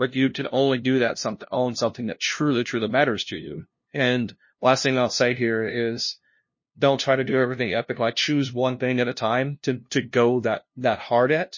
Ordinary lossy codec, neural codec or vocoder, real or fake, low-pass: MP3, 32 kbps; codec, 16 kHz, 0.5 kbps, FunCodec, trained on LibriTTS, 25 frames a second; fake; 7.2 kHz